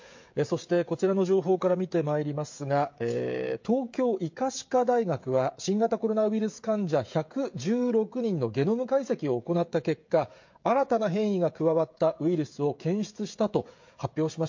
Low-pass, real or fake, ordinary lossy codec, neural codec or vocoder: 7.2 kHz; fake; MP3, 48 kbps; codec, 16 kHz, 16 kbps, FreqCodec, smaller model